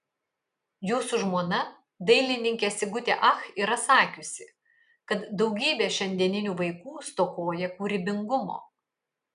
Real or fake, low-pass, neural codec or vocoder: real; 10.8 kHz; none